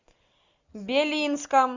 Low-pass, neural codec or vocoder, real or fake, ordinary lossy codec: 7.2 kHz; none; real; Opus, 64 kbps